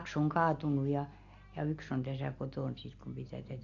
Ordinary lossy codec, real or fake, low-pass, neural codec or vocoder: AAC, 48 kbps; real; 7.2 kHz; none